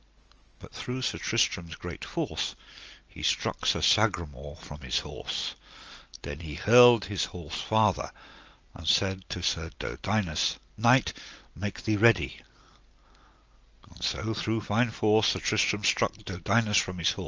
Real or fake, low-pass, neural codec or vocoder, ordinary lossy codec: real; 7.2 kHz; none; Opus, 24 kbps